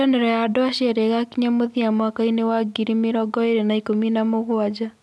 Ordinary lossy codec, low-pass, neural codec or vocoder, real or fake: none; none; none; real